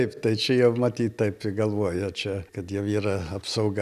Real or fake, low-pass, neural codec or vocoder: real; 14.4 kHz; none